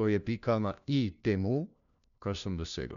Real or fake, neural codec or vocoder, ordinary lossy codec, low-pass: fake; codec, 16 kHz, 1 kbps, FunCodec, trained on LibriTTS, 50 frames a second; none; 7.2 kHz